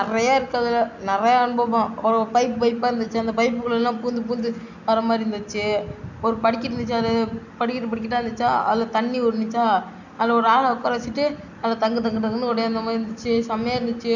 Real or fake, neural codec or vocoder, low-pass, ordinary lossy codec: real; none; 7.2 kHz; none